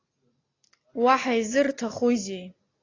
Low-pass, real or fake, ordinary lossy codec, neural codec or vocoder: 7.2 kHz; real; AAC, 32 kbps; none